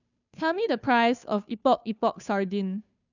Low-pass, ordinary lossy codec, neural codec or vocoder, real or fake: 7.2 kHz; none; codec, 16 kHz, 2 kbps, FunCodec, trained on Chinese and English, 25 frames a second; fake